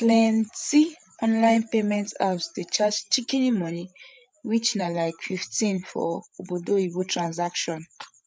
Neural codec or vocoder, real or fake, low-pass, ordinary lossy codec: codec, 16 kHz, 8 kbps, FreqCodec, larger model; fake; none; none